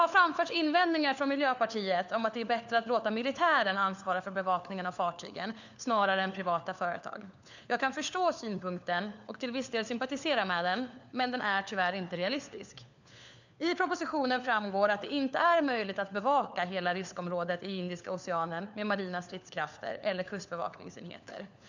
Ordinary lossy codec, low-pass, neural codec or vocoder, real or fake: none; 7.2 kHz; codec, 16 kHz, 4 kbps, FunCodec, trained on LibriTTS, 50 frames a second; fake